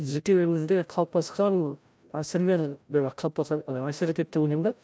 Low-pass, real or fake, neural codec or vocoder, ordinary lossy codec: none; fake; codec, 16 kHz, 0.5 kbps, FreqCodec, larger model; none